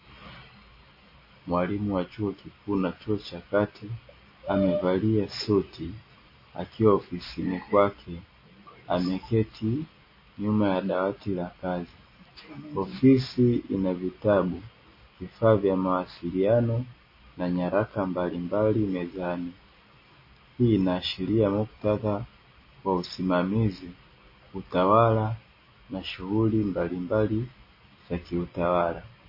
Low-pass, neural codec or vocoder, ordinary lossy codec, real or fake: 5.4 kHz; none; MP3, 24 kbps; real